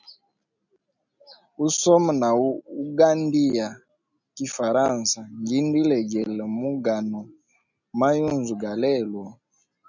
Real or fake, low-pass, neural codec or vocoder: real; 7.2 kHz; none